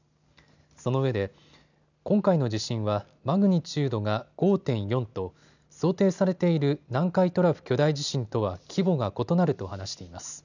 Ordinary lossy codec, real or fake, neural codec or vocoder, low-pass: none; real; none; 7.2 kHz